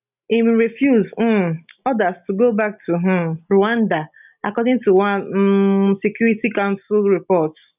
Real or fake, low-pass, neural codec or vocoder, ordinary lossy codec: real; 3.6 kHz; none; none